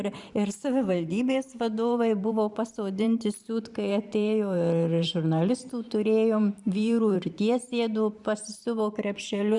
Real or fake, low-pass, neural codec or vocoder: fake; 10.8 kHz; vocoder, 44.1 kHz, 128 mel bands every 512 samples, BigVGAN v2